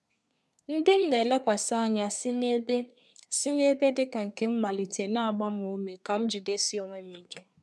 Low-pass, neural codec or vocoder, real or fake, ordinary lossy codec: none; codec, 24 kHz, 1 kbps, SNAC; fake; none